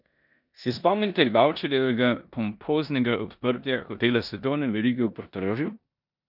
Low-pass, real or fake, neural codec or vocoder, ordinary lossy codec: 5.4 kHz; fake; codec, 16 kHz in and 24 kHz out, 0.9 kbps, LongCat-Audio-Codec, four codebook decoder; none